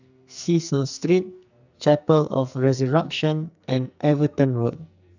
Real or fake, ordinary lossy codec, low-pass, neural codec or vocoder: fake; none; 7.2 kHz; codec, 44.1 kHz, 2.6 kbps, SNAC